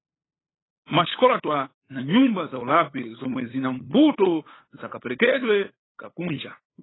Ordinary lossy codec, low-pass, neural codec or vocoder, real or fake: AAC, 16 kbps; 7.2 kHz; codec, 16 kHz, 8 kbps, FunCodec, trained on LibriTTS, 25 frames a second; fake